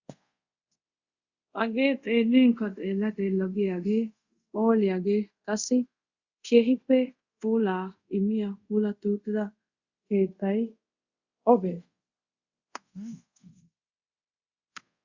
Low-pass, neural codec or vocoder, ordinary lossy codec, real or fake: 7.2 kHz; codec, 24 kHz, 0.5 kbps, DualCodec; Opus, 64 kbps; fake